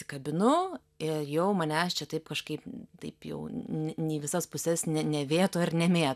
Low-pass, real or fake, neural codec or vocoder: 14.4 kHz; real; none